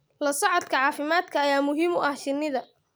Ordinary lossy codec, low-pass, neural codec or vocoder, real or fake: none; none; none; real